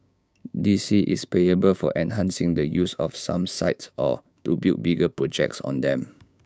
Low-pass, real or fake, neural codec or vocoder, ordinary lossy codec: none; fake; codec, 16 kHz, 6 kbps, DAC; none